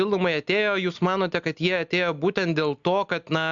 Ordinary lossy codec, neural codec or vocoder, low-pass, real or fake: MP3, 64 kbps; none; 7.2 kHz; real